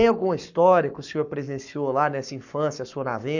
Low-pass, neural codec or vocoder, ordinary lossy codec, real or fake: 7.2 kHz; codec, 44.1 kHz, 7.8 kbps, Pupu-Codec; none; fake